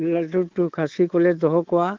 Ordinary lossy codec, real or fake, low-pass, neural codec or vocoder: Opus, 16 kbps; fake; 7.2 kHz; codec, 24 kHz, 3.1 kbps, DualCodec